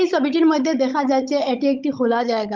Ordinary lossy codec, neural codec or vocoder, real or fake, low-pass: Opus, 32 kbps; codec, 16 kHz, 16 kbps, FunCodec, trained on Chinese and English, 50 frames a second; fake; 7.2 kHz